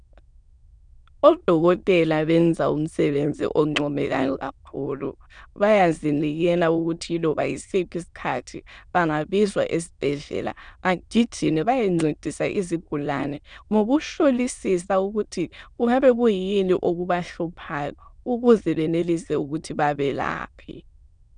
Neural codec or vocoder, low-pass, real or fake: autoencoder, 22.05 kHz, a latent of 192 numbers a frame, VITS, trained on many speakers; 9.9 kHz; fake